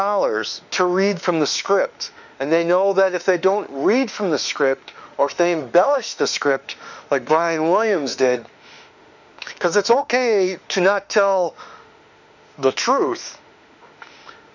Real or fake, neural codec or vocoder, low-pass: fake; autoencoder, 48 kHz, 32 numbers a frame, DAC-VAE, trained on Japanese speech; 7.2 kHz